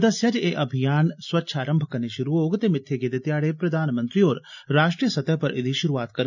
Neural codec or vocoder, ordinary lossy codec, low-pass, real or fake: none; none; 7.2 kHz; real